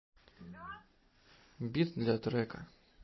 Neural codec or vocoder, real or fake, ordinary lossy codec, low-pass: none; real; MP3, 24 kbps; 7.2 kHz